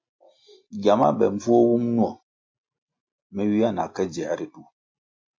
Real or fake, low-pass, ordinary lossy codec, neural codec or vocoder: real; 7.2 kHz; MP3, 48 kbps; none